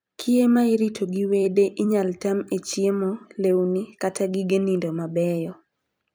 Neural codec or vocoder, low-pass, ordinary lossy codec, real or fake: vocoder, 44.1 kHz, 128 mel bands every 256 samples, BigVGAN v2; none; none; fake